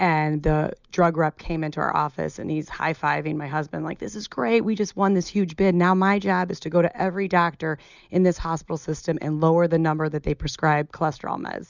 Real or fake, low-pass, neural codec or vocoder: real; 7.2 kHz; none